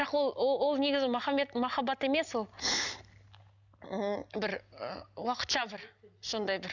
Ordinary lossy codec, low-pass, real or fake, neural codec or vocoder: none; 7.2 kHz; real; none